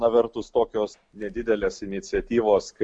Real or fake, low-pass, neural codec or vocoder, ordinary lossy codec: real; 9.9 kHz; none; MP3, 64 kbps